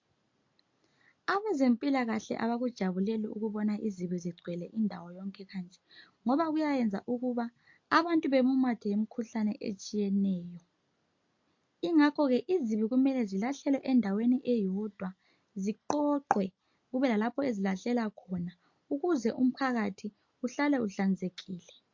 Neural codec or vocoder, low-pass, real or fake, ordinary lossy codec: none; 7.2 kHz; real; MP3, 48 kbps